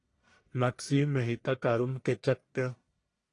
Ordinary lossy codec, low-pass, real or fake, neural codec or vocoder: AAC, 48 kbps; 10.8 kHz; fake; codec, 44.1 kHz, 1.7 kbps, Pupu-Codec